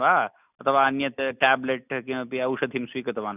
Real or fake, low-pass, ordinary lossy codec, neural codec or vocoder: real; 3.6 kHz; none; none